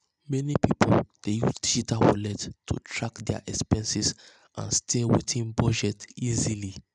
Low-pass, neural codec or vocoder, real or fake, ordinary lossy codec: 10.8 kHz; none; real; none